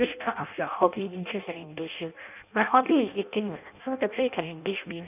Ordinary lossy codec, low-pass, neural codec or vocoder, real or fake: none; 3.6 kHz; codec, 16 kHz in and 24 kHz out, 0.6 kbps, FireRedTTS-2 codec; fake